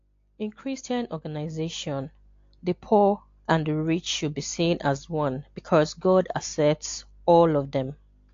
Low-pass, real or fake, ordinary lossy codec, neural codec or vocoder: 7.2 kHz; real; AAC, 48 kbps; none